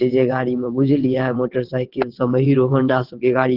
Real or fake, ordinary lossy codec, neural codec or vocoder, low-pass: fake; Opus, 16 kbps; vocoder, 22.05 kHz, 80 mel bands, WaveNeXt; 5.4 kHz